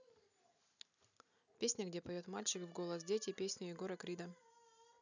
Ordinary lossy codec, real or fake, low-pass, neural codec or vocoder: none; real; 7.2 kHz; none